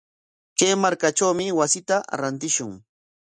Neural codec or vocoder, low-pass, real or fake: none; 9.9 kHz; real